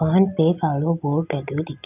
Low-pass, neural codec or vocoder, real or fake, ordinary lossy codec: 3.6 kHz; none; real; none